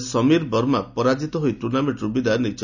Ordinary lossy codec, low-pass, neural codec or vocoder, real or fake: none; 7.2 kHz; none; real